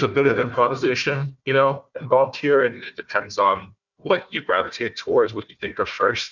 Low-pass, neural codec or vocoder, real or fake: 7.2 kHz; codec, 16 kHz, 1 kbps, FunCodec, trained on Chinese and English, 50 frames a second; fake